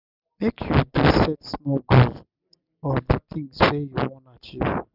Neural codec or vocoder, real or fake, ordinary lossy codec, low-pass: none; real; none; 5.4 kHz